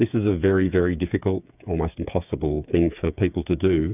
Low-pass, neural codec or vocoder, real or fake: 3.6 kHz; codec, 16 kHz, 8 kbps, FreqCodec, smaller model; fake